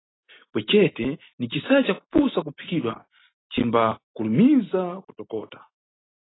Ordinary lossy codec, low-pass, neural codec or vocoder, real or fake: AAC, 16 kbps; 7.2 kHz; none; real